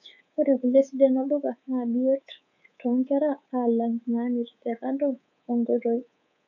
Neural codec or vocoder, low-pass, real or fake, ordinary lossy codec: codec, 24 kHz, 3.1 kbps, DualCodec; 7.2 kHz; fake; none